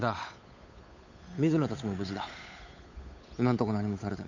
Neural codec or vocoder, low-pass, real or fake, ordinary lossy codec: codec, 16 kHz, 8 kbps, FunCodec, trained on Chinese and English, 25 frames a second; 7.2 kHz; fake; MP3, 64 kbps